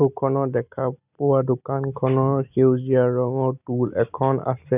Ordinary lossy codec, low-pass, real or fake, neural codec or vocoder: none; 3.6 kHz; real; none